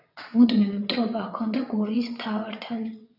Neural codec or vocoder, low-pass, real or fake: vocoder, 22.05 kHz, 80 mel bands, WaveNeXt; 5.4 kHz; fake